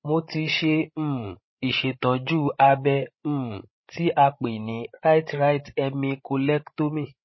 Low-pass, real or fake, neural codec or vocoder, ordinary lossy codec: 7.2 kHz; fake; codec, 16 kHz, 16 kbps, FreqCodec, larger model; MP3, 24 kbps